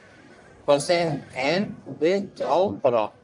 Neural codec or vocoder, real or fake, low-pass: codec, 44.1 kHz, 1.7 kbps, Pupu-Codec; fake; 10.8 kHz